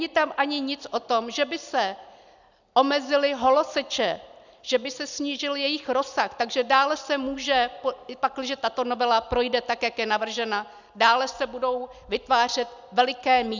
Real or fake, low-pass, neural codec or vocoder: real; 7.2 kHz; none